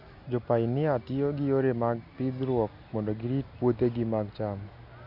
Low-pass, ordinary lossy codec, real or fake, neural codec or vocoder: 5.4 kHz; none; real; none